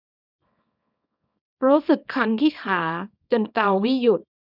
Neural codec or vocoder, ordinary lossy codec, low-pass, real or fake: codec, 24 kHz, 0.9 kbps, WavTokenizer, small release; none; 5.4 kHz; fake